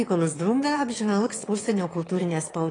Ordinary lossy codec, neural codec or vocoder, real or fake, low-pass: AAC, 32 kbps; autoencoder, 22.05 kHz, a latent of 192 numbers a frame, VITS, trained on one speaker; fake; 9.9 kHz